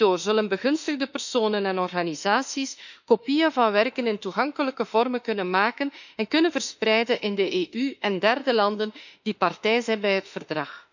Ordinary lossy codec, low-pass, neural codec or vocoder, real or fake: none; 7.2 kHz; autoencoder, 48 kHz, 32 numbers a frame, DAC-VAE, trained on Japanese speech; fake